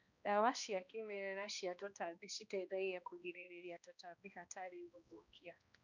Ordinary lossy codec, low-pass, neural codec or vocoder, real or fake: none; 7.2 kHz; codec, 16 kHz, 1 kbps, X-Codec, HuBERT features, trained on balanced general audio; fake